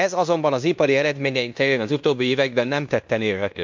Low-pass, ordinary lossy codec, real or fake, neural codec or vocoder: 7.2 kHz; MP3, 64 kbps; fake; codec, 16 kHz in and 24 kHz out, 0.9 kbps, LongCat-Audio-Codec, fine tuned four codebook decoder